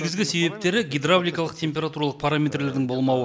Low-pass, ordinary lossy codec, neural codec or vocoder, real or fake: none; none; none; real